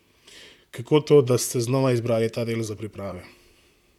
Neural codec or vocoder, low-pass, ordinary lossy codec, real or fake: vocoder, 44.1 kHz, 128 mel bands, Pupu-Vocoder; 19.8 kHz; none; fake